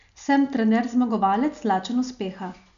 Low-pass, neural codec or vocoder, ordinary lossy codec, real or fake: 7.2 kHz; none; none; real